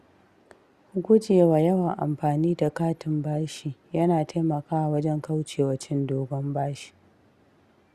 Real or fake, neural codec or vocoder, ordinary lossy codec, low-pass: real; none; Opus, 64 kbps; 14.4 kHz